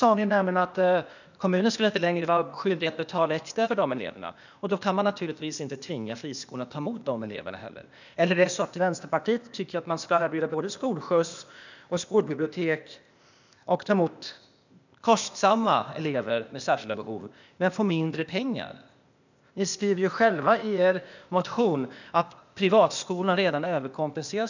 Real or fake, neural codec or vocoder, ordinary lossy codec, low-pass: fake; codec, 16 kHz, 0.8 kbps, ZipCodec; none; 7.2 kHz